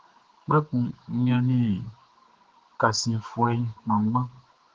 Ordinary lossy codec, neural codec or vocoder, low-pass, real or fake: Opus, 16 kbps; codec, 16 kHz, 4 kbps, X-Codec, HuBERT features, trained on general audio; 7.2 kHz; fake